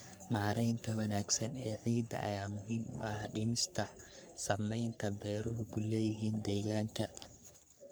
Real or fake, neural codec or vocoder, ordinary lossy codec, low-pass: fake; codec, 44.1 kHz, 3.4 kbps, Pupu-Codec; none; none